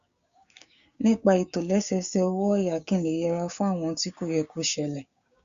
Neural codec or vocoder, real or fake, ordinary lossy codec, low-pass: codec, 16 kHz, 6 kbps, DAC; fake; Opus, 64 kbps; 7.2 kHz